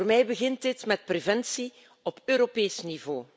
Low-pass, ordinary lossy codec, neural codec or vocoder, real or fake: none; none; none; real